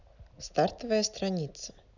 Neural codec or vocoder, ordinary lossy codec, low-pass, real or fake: none; none; 7.2 kHz; real